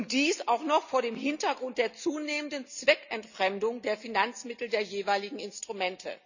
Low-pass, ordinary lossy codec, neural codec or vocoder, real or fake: 7.2 kHz; none; none; real